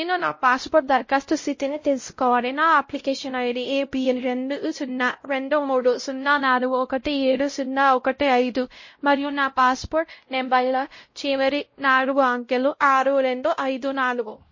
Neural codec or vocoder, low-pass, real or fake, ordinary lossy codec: codec, 16 kHz, 0.5 kbps, X-Codec, WavLM features, trained on Multilingual LibriSpeech; 7.2 kHz; fake; MP3, 32 kbps